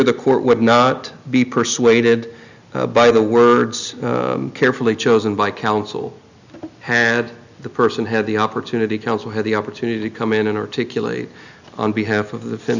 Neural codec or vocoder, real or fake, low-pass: none; real; 7.2 kHz